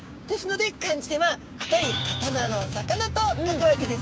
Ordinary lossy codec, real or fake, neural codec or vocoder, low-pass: none; fake; codec, 16 kHz, 6 kbps, DAC; none